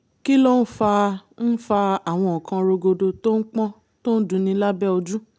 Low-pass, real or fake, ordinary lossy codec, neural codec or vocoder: none; real; none; none